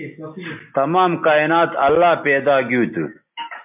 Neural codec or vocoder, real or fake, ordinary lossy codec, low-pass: none; real; MP3, 32 kbps; 3.6 kHz